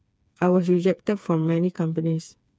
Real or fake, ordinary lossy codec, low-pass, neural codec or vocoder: fake; none; none; codec, 16 kHz, 4 kbps, FreqCodec, smaller model